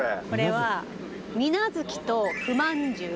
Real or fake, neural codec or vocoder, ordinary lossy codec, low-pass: real; none; none; none